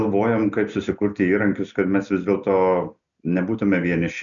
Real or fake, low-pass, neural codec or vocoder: real; 7.2 kHz; none